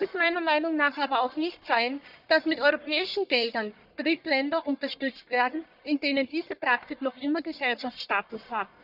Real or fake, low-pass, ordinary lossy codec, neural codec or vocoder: fake; 5.4 kHz; none; codec, 44.1 kHz, 1.7 kbps, Pupu-Codec